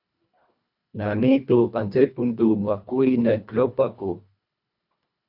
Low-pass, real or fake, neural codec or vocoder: 5.4 kHz; fake; codec, 24 kHz, 1.5 kbps, HILCodec